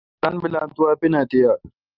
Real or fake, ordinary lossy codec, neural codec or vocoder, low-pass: real; Opus, 24 kbps; none; 5.4 kHz